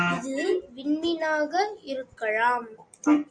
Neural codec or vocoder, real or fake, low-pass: none; real; 9.9 kHz